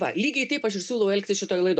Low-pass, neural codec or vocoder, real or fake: 9.9 kHz; none; real